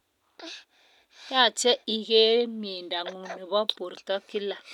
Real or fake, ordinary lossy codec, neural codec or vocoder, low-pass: real; none; none; 19.8 kHz